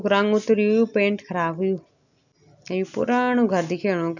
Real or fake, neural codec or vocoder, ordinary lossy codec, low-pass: real; none; none; 7.2 kHz